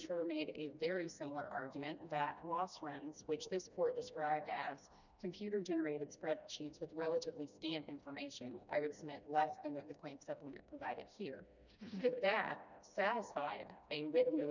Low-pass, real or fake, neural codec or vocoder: 7.2 kHz; fake; codec, 16 kHz, 1 kbps, FreqCodec, smaller model